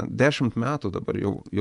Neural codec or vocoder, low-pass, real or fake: vocoder, 24 kHz, 100 mel bands, Vocos; 10.8 kHz; fake